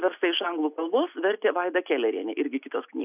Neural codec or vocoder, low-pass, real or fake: none; 3.6 kHz; real